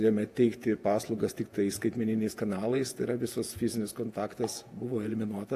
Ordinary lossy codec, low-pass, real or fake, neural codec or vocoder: AAC, 64 kbps; 14.4 kHz; fake; vocoder, 48 kHz, 128 mel bands, Vocos